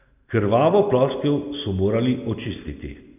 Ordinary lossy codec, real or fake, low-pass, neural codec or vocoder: none; real; 3.6 kHz; none